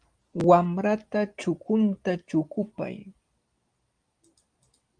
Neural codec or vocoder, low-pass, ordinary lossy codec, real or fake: none; 9.9 kHz; Opus, 32 kbps; real